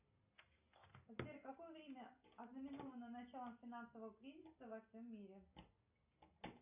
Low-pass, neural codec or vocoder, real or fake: 3.6 kHz; none; real